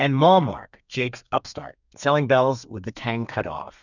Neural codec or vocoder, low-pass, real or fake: codec, 32 kHz, 1.9 kbps, SNAC; 7.2 kHz; fake